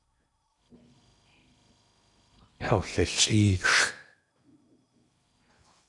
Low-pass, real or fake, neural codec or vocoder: 10.8 kHz; fake; codec, 16 kHz in and 24 kHz out, 0.8 kbps, FocalCodec, streaming, 65536 codes